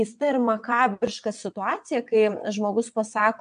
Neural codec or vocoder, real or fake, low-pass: vocoder, 22.05 kHz, 80 mel bands, WaveNeXt; fake; 9.9 kHz